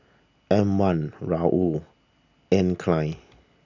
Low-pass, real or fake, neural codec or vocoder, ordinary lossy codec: 7.2 kHz; real; none; none